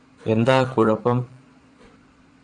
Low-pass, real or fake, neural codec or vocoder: 9.9 kHz; fake; vocoder, 22.05 kHz, 80 mel bands, Vocos